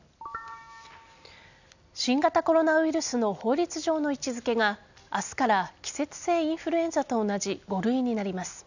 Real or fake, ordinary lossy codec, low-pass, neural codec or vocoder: real; none; 7.2 kHz; none